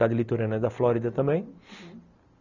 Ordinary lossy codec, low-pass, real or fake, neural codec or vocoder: none; 7.2 kHz; real; none